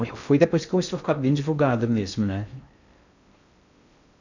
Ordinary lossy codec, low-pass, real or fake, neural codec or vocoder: none; 7.2 kHz; fake; codec, 16 kHz in and 24 kHz out, 0.6 kbps, FocalCodec, streaming, 2048 codes